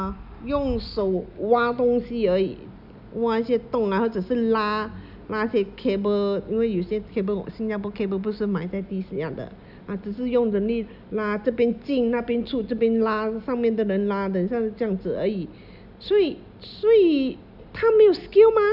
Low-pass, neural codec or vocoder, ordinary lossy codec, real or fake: 5.4 kHz; none; none; real